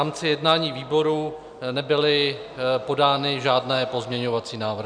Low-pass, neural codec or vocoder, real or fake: 9.9 kHz; none; real